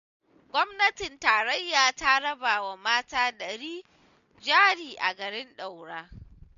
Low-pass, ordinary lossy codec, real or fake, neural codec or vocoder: 7.2 kHz; none; real; none